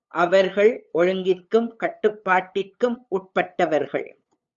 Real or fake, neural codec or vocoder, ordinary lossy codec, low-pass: fake; codec, 16 kHz, 8 kbps, FunCodec, trained on LibriTTS, 25 frames a second; Opus, 64 kbps; 7.2 kHz